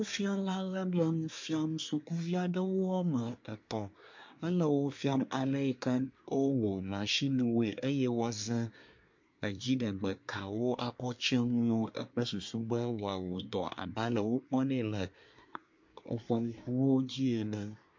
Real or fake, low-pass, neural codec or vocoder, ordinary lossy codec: fake; 7.2 kHz; codec, 24 kHz, 1 kbps, SNAC; MP3, 48 kbps